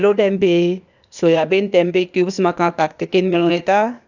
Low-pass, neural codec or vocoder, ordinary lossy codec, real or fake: 7.2 kHz; codec, 16 kHz, 0.8 kbps, ZipCodec; none; fake